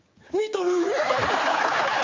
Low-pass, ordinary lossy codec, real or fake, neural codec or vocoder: 7.2 kHz; Opus, 32 kbps; fake; codec, 16 kHz, 4 kbps, X-Codec, HuBERT features, trained on general audio